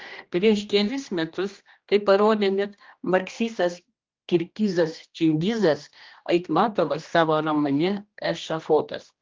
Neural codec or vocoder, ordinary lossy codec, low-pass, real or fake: codec, 16 kHz, 1 kbps, X-Codec, HuBERT features, trained on general audio; Opus, 32 kbps; 7.2 kHz; fake